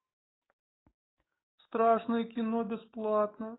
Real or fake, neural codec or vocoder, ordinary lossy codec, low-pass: fake; codec, 44.1 kHz, 7.8 kbps, DAC; AAC, 16 kbps; 7.2 kHz